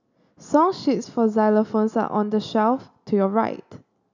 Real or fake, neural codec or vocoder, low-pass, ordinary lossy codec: real; none; 7.2 kHz; none